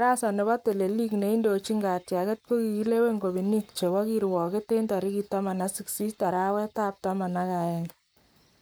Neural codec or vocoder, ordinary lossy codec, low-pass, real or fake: codec, 44.1 kHz, 7.8 kbps, Pupu-Codec; none; none; fake